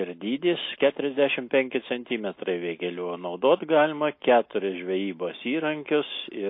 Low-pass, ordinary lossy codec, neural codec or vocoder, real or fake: 5.4 kHz; MP3, 24 kbps; none; real